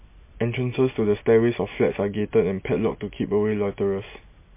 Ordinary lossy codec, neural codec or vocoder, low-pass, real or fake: MP3, 24 kbps; none; 3.6 kHz; real